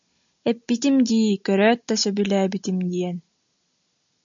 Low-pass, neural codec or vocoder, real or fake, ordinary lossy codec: 7.2 kHz; none; real; MP3, 64 kbps